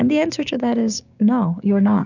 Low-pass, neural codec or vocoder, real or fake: 7.2 kHz; codec, 16 kHz, 6 kbps, DAC; fake